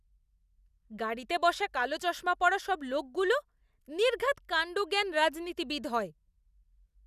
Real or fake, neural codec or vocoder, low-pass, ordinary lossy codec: real; none; 14.4 kHz; none